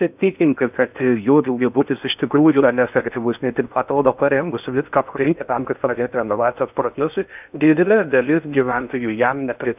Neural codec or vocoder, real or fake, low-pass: codec, 16 kHz in and 24 kHz out, 0.6 kbps, FocalCodec, streaming, 4096 codes; fake; 3.6 kHz